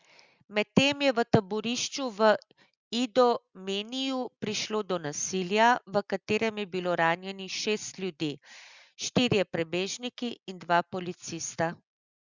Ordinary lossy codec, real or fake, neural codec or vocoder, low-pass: Opus, 64 kbps; real; none; 7.2 kHz